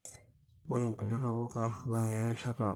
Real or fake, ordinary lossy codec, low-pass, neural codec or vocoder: fake; none; none; codec, 44.1 kHz, 1.7 kbps, Pupu-Codec